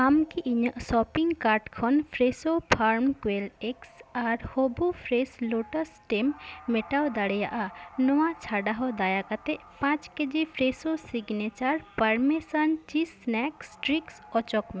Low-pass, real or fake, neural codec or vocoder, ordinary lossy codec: none; real; none; none